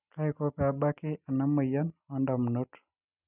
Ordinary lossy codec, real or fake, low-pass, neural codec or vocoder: none; real; 3.6 kHz; none